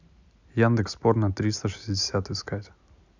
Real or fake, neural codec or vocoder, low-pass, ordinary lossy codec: real; none; 7.2 kHz; none